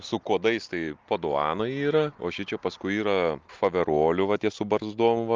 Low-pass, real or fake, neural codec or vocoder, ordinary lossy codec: 7.2 kHz; real; none; Opus, 24 kbps